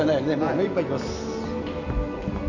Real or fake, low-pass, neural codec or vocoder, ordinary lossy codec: real; 7.2 kHz; none; none